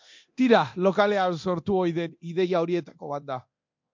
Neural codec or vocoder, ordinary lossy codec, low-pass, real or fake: codec, 24 kHz, 0.9 kbps, DualCodec; MP3, 48 kbps; 7.2 kHz; fake